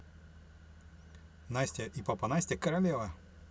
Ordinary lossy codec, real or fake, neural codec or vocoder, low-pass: none; real; none; none